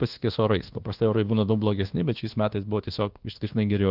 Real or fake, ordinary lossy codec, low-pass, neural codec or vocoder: fake; Opus, 16 kbps; 5.4 kHz; codec, 24 kHz, 1.2 kbps, DualCodec